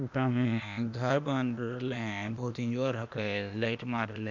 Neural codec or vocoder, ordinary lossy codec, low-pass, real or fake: codec, 16 kHz, 0.8 kbps, ZipCodec; none; 7.2 kHz; fake